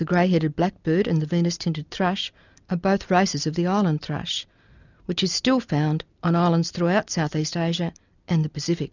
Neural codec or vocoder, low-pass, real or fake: none; 7.2 kHz; real